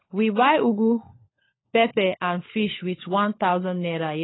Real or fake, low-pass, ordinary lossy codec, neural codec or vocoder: fake; 7.2 kHz; AAC, 16 kbps; codec, 16 kHz, 16 kbps, FunCodec, trained on LibriTTS, 50 frames a second